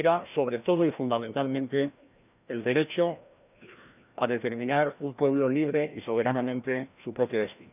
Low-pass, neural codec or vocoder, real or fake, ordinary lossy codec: 3.6 kHz; codec, 16 kHz, 1 kbps, FreqCodec, larger model; fake; none